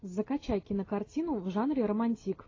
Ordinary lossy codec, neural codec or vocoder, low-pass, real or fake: AAC, 32 kbps; none; 7.2 kHz; real